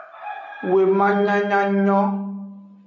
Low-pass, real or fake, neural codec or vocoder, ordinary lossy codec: 7.2 kHz; real; none; MP3, 96 kbps